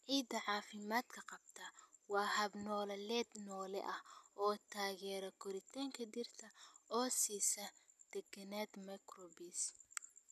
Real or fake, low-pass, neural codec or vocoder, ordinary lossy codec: real; 14.4 kHz; none; none